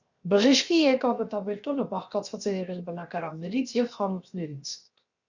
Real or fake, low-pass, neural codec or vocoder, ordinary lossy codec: fake; 7.2 kHz; codec, 16 kHz, 0.7 kbps, FocalCodec; Opus, 64 kbps